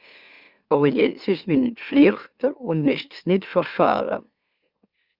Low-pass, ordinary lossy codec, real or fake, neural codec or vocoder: 5.4 kHz; Opus, 64 kbps; fake; autoencoder, 44.1 kHz, a latent of 192 numbers a frame, MeloTTS